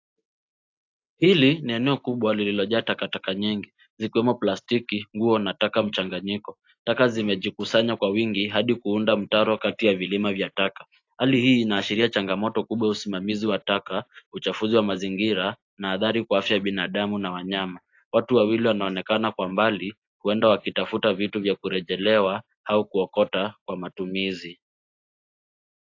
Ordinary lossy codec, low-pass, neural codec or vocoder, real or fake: AAC, 48 kbps; 7.2 kHz; none; real